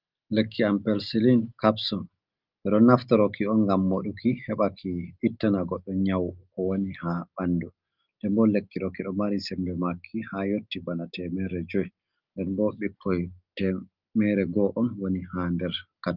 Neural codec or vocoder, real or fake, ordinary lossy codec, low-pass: none; real; Opus, 32 kbps; 5.4 kHz